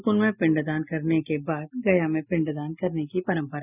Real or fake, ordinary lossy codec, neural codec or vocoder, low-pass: real; none; none; 3.6 kHz